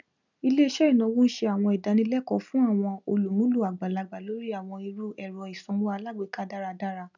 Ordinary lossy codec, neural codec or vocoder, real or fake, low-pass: none; none; real; 7.2 kHz